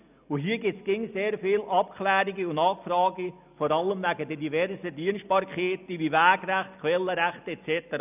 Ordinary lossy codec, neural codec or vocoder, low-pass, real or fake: none; none; 3.6 kHz; real